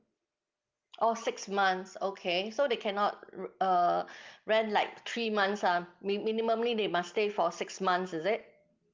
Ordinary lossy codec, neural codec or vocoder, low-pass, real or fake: Opus, 32 kbps; none; 7.2 kHz; real